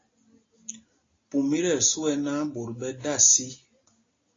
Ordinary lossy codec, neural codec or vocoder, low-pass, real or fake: AAC, 32 kbps; none; 7.2 kHz; real